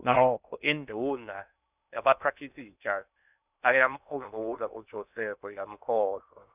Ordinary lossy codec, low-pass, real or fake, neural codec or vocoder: none; 3.6 kHz; fake; codec, 16 kHz in and 24 kHz out, 0.6 kbps, FocalCodec, streaming, 2048 codes